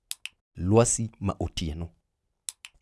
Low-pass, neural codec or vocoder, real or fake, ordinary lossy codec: none; none; real; none